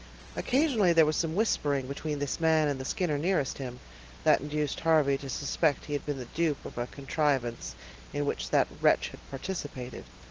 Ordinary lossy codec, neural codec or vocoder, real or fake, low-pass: Opus, 16 kbps; none; real; 7.2 kHz